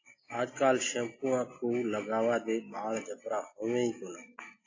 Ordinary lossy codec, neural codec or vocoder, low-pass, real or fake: AAC, 32 kbps; none; 7.2 kHz; real